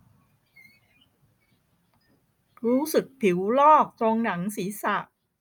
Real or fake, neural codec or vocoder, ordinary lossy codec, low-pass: real; none; none; none